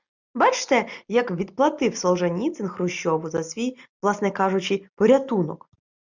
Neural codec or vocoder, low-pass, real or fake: none; 7.2 kHz; real